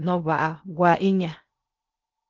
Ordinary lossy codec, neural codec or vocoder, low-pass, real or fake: Opus, 24 kbps; codec, 16 kHz in and 24 kHz out, 0.8 kbps, FocalCodec, streaming, 65536 codes; 7.2 kHz; fake